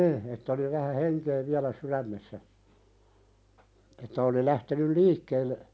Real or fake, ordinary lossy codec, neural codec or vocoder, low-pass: real; none; none; none